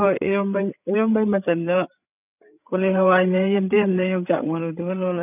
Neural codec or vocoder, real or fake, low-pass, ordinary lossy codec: codec, 16 kHz, 8 kbps, FreqCodec, larger model; fake; 3.6 kHz; none